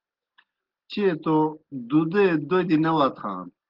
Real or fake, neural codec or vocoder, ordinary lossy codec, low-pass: real; none; Opus, 16 kbps; 5.4 kHz